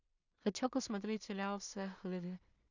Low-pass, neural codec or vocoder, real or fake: 7.2 kHz; codec, 16 kHz in and 24 kHz out, 0.4 kbps, LongCat-Audio-Codec, two codebook decoder; fake